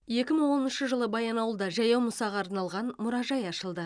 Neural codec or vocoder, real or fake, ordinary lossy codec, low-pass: none; real; none; 9.9 kHz